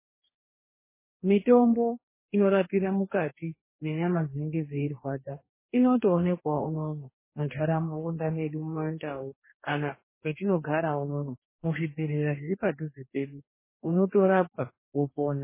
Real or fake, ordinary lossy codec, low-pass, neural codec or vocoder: fake; MP3, 16 kbps; 3.6 kHz; codec, 44.1 kHz, 2.6 kbps, DAC